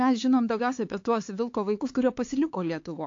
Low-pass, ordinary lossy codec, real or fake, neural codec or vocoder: 7.2 kHz; AAC, 48 kbps; fake; codec, 16 kHz, 4 kbps, X-Codec, HuBERT features, trained on LibriSpeech